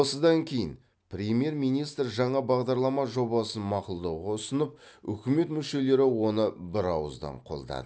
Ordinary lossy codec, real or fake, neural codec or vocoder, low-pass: none; real; none; none